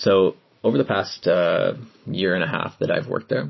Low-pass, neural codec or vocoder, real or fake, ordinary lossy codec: 7.2 kHz; none; real; MP3, 24 kbps